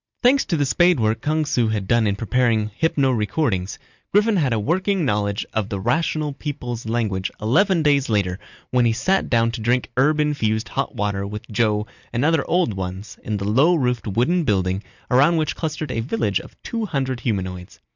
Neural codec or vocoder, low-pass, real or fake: none; 7.2 kHz; real